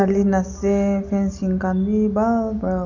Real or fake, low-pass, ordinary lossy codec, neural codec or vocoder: real; 7.2 kHz; none; none